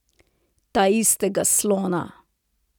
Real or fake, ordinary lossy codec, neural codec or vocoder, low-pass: real; none; none; none